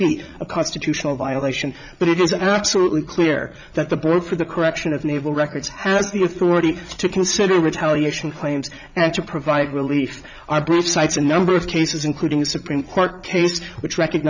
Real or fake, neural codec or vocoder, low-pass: fake; vocoder, 44.1 kHz, 80 mel bands, Vocos; 7.2 kHz